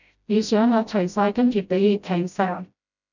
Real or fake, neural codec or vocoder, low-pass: fake; codec, 16 kHz, 0.5 kbps, FreqCodec, smaller model; 7.2 kHz